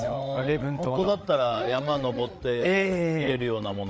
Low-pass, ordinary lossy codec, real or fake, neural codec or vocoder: none; none; fake; codec, 16 kHz, 8 kbps, FreqCodec, larger model